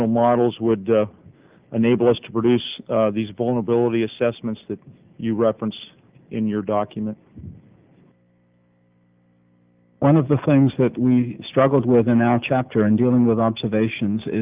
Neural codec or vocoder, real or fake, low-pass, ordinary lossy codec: none; real; 3.6 kHz; Opus, 32 kbps